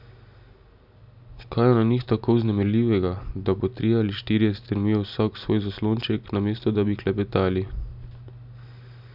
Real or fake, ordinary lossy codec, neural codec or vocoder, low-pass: real; none; none; 5.4 kHz